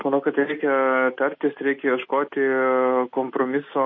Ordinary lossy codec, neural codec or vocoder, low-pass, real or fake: MP3, 32 kbps; none; 7.2 kHz; real